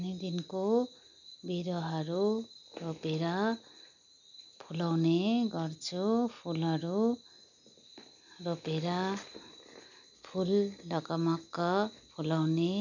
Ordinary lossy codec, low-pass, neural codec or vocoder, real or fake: none; 7.2 kHz; none; real